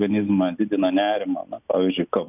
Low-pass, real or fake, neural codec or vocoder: 3.6 kHz; real; none